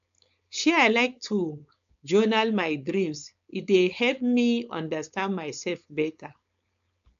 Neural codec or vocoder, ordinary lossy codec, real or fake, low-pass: codec, 16 kHz, 4.8 kbps, FACodec; AAC, 96 kbps; fake; 7.2 kHz